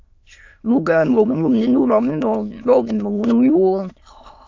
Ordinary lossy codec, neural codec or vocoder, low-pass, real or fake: AAC, 48 kbps; autoencoder, 22.05 kHz, a latent of 192 numbers a frame, VITS, trained on many speakers; 7.2 kHz; fake